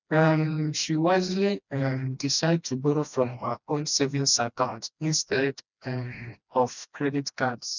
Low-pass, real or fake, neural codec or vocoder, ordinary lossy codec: 7.2 kHz; fake; codec, 16 kHz, 1 kbps, FreqCodec, smaller model; none